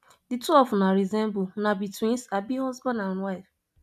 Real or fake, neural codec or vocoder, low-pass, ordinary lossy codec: real; none; 14.4 kHz; none